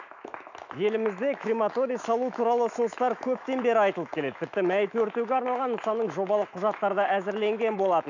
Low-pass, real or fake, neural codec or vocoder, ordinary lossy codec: 7.2 kHz; fake; autoencoder, 48 kHz, 128 numbers a frame, DAC-VAE, trained on Japanese speech; none